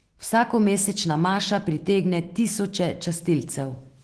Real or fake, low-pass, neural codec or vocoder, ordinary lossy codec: fake; 10.8 kHz; autoencoder, 48 kHz, 128 numbers a frame, DAC-VAE, trained on Japanese speech; Opus, 16 kbps